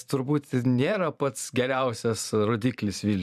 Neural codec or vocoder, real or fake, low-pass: vocoder, 44.1 kHz, 128 mel bands every 512 samples, BigVGAN v2; fake; 14.4 kHz